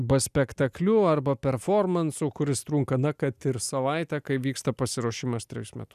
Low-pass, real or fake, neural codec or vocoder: 14.4 kHz; real; none